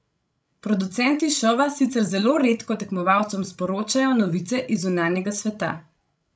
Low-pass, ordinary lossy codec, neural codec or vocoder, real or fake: none; none; codec, 16 kHz, 16 kbps, FreqCodec, larger model; fake